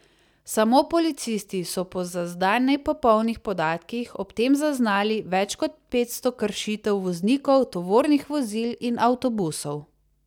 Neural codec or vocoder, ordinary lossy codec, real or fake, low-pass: none; none; real; 19.8 kHz